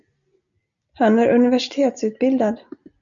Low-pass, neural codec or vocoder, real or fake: 7.2 kHz; none; real